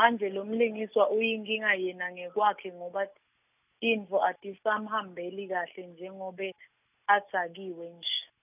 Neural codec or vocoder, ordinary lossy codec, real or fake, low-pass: none; none; real; 3.6 kHz